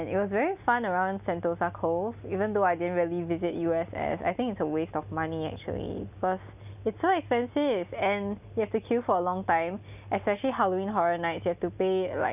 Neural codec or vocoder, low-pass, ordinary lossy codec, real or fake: none; 3.6 kHz; none; real